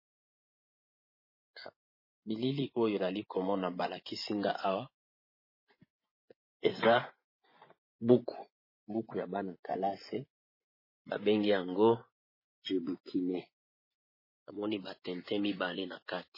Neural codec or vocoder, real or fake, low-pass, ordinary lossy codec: vocoder, 24 kHz, 100 mel bands, Vocos; fake; 5.4 kHz; MP3, 24 kbps